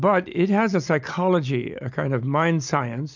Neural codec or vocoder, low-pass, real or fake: none; 7.2 kHz; real